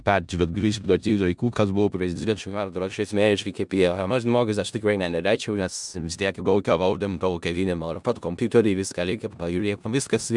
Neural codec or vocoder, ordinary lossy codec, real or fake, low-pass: codec, 16 kHz in and 24 kHz out, 0.4 kbps, LongCat-Audio-Codec, four codebook decoder; MP3, 96 kbps; fake; 10.8 kHz